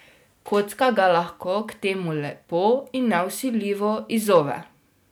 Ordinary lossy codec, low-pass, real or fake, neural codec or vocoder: none; none; real; none